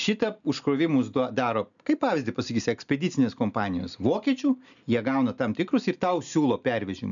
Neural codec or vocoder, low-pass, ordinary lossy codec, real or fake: none; 7.2 kHz; MP3, 96 kbps; real